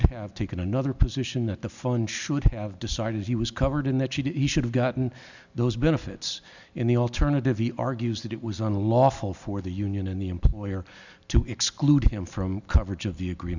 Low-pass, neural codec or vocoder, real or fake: 7.2 kHz; none; real